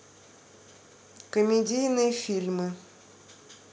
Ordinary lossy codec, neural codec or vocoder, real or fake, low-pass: none; none; real; none